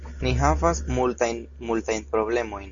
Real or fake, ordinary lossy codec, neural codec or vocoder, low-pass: real; MP3, 64 kbps; none; 7.2 kHz